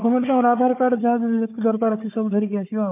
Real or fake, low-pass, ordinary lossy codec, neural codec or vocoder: fake; 3.6 kHz; MP3, 32 kbps; codec, 16 kHz, 4 kbps, FunCodec, trained on Chinese and English, 50 frames a second